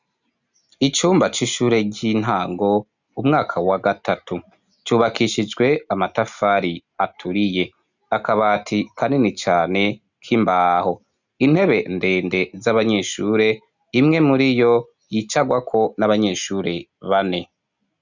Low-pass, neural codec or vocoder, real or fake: 7.2 kHz; none; real